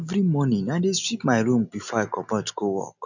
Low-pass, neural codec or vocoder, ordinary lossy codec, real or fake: 7.2 kHz; none; none; real